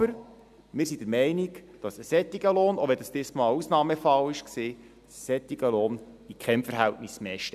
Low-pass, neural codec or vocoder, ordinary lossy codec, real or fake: 14.4 kHz; none; none; real